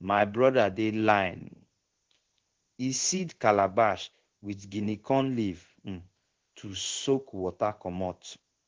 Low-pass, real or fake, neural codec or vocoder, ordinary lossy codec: 7.2 kHz; fake; codec, 16 kHz in and 24 kHz out, 1 kbps, XY-Tokenizer; Opus, 16 kbps